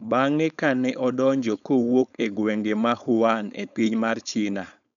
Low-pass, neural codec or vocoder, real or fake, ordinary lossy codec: 7.2 kHz; codec, 16 kHz, 4.8 kbps, FACodec; fake; none